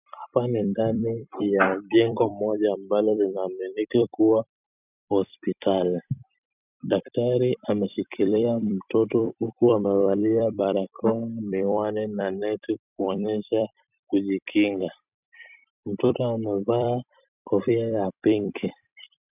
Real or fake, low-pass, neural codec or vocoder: fake; 3.6 kHz; vocoder, 44.1 kHz, 128 mel bands every 256 samples, BigVGAN v2